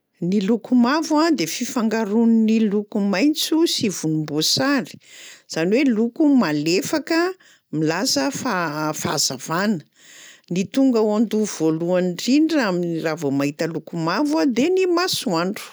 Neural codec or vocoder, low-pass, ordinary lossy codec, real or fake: none; none; none; real